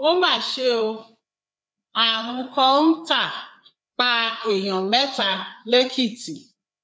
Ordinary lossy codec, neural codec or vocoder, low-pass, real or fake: none; codec, 16 kHz, 4 kbps, FreqCodec, larger model; none; fake